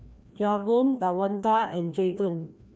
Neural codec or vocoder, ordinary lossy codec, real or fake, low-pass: codec, 16 kHz, 1 kbps, FreqCodec, larger model; none; fake; none